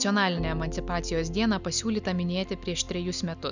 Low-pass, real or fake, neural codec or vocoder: 7.2 kHz; real; none